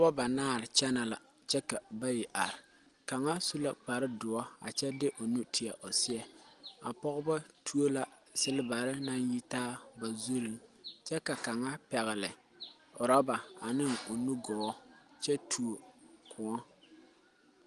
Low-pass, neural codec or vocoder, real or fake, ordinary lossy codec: 10.8 kHz; none; real; Opus, 24 kbps